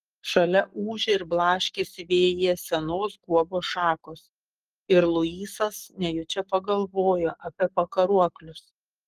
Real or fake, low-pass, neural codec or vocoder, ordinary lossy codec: fake; 14.4 kHz; codec, 44.1 kHz, 7.8 kbps, Pupu-Codec; Opus, 24 kbps